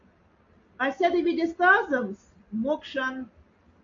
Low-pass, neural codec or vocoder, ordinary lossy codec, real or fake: 7.2 kHz; none; AAC, 48 kbps; real